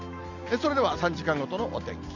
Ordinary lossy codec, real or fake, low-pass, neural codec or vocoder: none; real; 7.2 kHz; none